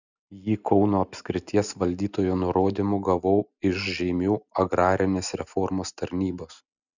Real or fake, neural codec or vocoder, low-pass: real; none; 7.2 kHz